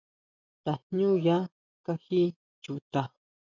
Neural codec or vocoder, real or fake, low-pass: none; real; 7.2 kHz